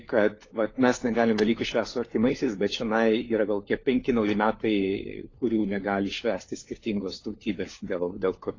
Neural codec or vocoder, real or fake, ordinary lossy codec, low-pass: codec, 16 kHz, 4 kbps, FunCodec, trained on LibriTTS, 50 frames a second; fake; AAC, 32 kbps; 7.2 kHz